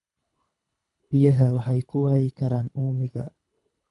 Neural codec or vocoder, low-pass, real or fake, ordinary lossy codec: codec, 24 kHz, 3 kbps, HILCodec; 10.8 kHz; fake; none